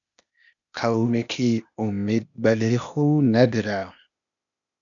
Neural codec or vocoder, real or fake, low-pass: codec, 16 kHz, 0.8 kbps, ZipCodec; fake; 7.2 kHz